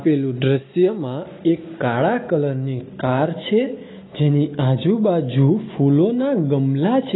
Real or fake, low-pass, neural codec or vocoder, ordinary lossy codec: real; 7.2 kHz; none; AAC, 16 kbps